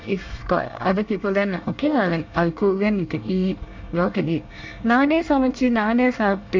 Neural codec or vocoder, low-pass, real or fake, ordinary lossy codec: codec, 24 kHz, 1 kbps, SNAC; 7.2 kHz; fake; MP3, 64 kbps